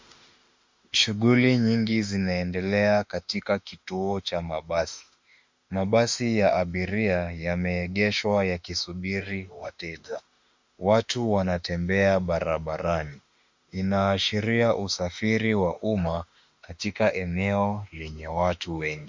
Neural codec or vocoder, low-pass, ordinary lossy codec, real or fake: autoencoder, 48 kHz, 32 numbers a frame, DAC-VAE, trained on Japanese speech; 7.2 kHz; MP3, 64 kbps; fake